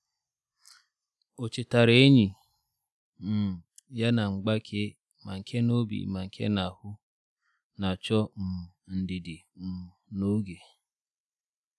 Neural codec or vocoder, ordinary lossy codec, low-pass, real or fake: none; none; none; real